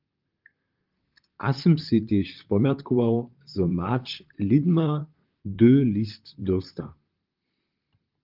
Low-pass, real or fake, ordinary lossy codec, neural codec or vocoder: 5.4 kHz; fake; Opus, 24 kbps; vocoder, 44.1 kHz, 128 mel bands, Pupu-Vocoder